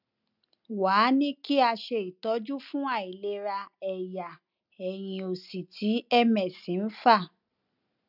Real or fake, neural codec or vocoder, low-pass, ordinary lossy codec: real; none; 5.4 kHz; none